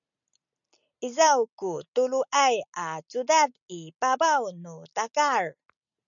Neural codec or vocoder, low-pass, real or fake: none; 7.2 kHz; real